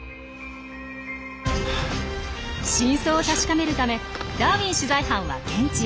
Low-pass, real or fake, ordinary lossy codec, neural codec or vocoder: none; real; none; none